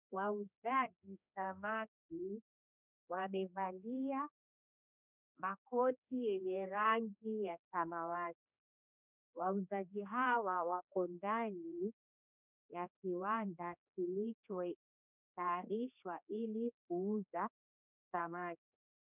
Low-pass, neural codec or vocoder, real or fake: 3.6 kHz; codec, 16 kHz, 2 kbps, X-Codec, HuBERT features, trained on general audio; fake